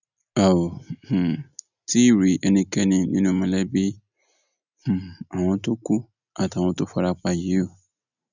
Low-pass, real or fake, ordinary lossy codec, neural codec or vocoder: 7.2 kHz; real; none; none